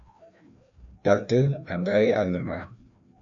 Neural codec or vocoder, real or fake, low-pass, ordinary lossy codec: codec, 16 kHz, 1 kbps, FreqCodec, larger model; fake; 7.2 kHz; MP3, 64 kbps